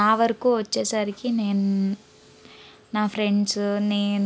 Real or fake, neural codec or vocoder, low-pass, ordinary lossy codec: real; none; none; none